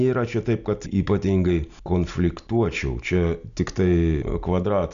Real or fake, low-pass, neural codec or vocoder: real; 7.2 kHz; none